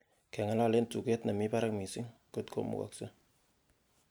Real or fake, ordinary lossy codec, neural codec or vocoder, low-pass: real; none; none; none